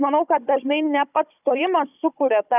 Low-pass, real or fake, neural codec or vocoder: 3.6 kHz; fake; codec, 16 kHz, 16 kbps, FunCodec, trained on Chinese and English, 50 frames a second